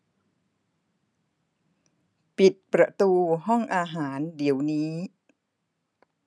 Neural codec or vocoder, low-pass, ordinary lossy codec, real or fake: none; none; none; real